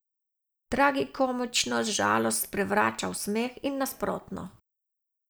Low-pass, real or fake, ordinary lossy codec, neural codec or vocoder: none; real; none; none